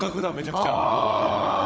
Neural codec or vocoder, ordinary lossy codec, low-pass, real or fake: codec, 16 kHz, 16 kbps, FunCodec, trained on Chinese and English, 50 frames a second; none; none; fake